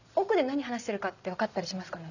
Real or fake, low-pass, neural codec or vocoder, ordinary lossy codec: real; 7.2 kHz; none; none